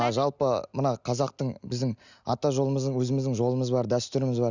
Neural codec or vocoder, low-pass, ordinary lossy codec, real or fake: none; 7.2 kHz; none; real